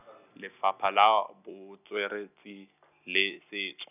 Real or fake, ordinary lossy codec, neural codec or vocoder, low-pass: real; none; none; 3.6 kHz